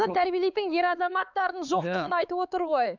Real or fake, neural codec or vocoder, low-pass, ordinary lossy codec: fake; codec, 16 kHz, 4 kbps, X-Codec, HuBERT features, trained on LibriSpeech; 7.2 kHz; none